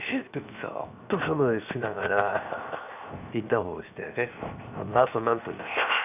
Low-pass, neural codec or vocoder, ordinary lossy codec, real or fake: 3.6 kHz; codec, 16 kHz, 0.7 kbps, FocalCodec; none; fake